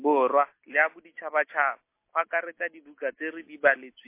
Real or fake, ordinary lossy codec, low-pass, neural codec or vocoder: real; MP3, 24 kbps; 3.6 kHz; none